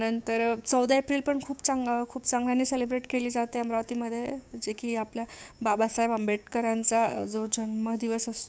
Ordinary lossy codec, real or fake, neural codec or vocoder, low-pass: none; fake; codec, 16 kHz, 6 kbps, DAC; none